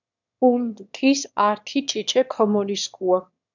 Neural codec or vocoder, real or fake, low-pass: autoencoder, 22.05 kHz, a latent of 192 numbers a frame, VITS, trained on one speaker; fake; 7.2 kHz